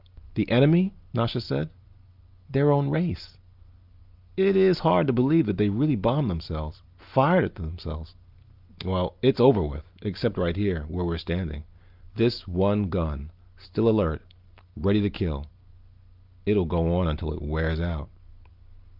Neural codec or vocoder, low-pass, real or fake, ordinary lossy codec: none; 5.4 kHz; real; Opus, 32 kbps